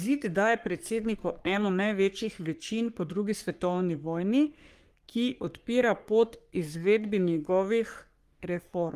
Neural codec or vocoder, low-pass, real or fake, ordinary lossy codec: codec, 44.1 kHz, 3.4 kbps, Pupu-Codec; 14.4 kHz; fake; Opus, 32 kbps